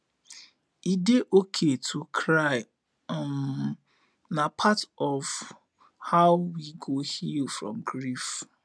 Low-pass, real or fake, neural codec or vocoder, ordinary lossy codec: none; real; none; none